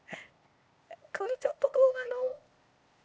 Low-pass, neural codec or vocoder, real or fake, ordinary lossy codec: none; codec, 16 kHz, 0.8 kbps, ZipCodec; fake; none